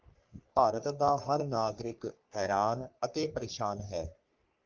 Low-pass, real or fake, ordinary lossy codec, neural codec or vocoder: 7.2 kHz; fake; Opus, 32 kbps; codec, 44.1 kHz, 3.4 kbps, Pupu-Codec